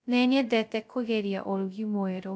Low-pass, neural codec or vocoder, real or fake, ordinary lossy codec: none; codec, 16 kHz, 0.2 kbps, FocalCodec; fake; none